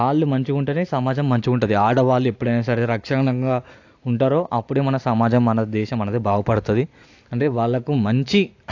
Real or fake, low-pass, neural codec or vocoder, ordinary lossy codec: real; 7.2 kHz; none; AAC, 48 kbps